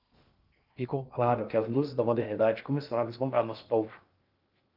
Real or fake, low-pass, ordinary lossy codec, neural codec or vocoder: fake; 5.4 kHz; Opus, 24 kbps; codec, 16 kHz in and 24 kHz out, 0.6 kbps, FocalCodec, streaming, 2048 codes